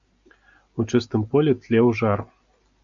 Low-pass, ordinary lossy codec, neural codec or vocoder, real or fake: 7.2 kHz; MP3, 64 kbps; none; real